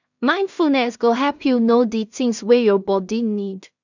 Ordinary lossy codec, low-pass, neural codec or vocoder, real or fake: none; 7.2 kHz; codec, 16 kHz in and 24 kHz out, 0.4 kbps, LongCat-Audio-Codec, two codebook decoder; fake